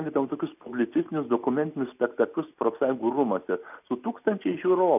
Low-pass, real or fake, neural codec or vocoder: 3.6 kHz; real; none